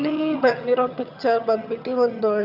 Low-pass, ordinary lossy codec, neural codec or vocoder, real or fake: 5.4 kHz; none; vocoder, 22.05 kHz, 80 mel bands, HiFi-GAN; fake